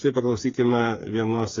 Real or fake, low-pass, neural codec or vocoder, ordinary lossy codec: fake; 7.2 kHz; codec, 16 kHz, 4 kbps, FreqCodec, smaller model; AAC, 32 kbps